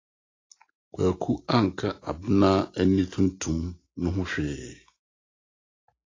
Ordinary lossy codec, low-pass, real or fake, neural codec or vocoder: AAC, 32 kbps; 7.2 kHz; real; none